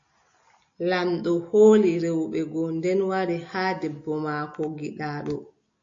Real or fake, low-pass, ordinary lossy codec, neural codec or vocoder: real; 7.2 kHz; AAC, 48 kbps; none